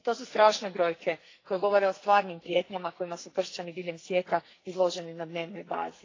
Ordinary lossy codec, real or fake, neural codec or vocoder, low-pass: AAC, 32 kbps; fake; codec, 44.1 kHz, 2.6 kbps, SNAC; 7.2 kHz